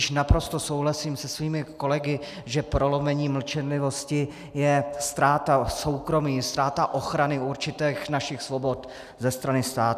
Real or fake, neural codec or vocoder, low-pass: real; none; 14.4 kHz